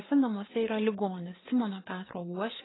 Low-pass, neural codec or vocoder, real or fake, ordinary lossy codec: 7.2 kHz; codec, 16 kHz, 4 kbps, FreqCodec, larger model; fake; AAC, 16 kbps